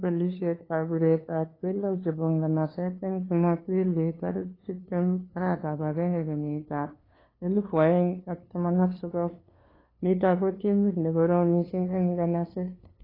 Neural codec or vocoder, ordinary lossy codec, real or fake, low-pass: codec, 16 kHz, 2 kbps, FunCodec, trained on LibriTTS, 25 frames a second; AAC, 24 kbps; fake; 5.4 kHz